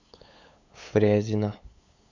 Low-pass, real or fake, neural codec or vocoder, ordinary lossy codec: 7.2 kHz; fake; codec, 16 kHz, 8 kbps, FunCodec, trained on LibriTTS, 25 frames a second; AAC, 48 kbps